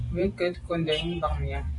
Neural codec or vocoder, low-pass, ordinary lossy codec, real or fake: vocoder, 44.1 kHz, 128 mel bands every 512 samples, BigVGAN v2; 10.8 kHz; AAC, 64 kbps; fake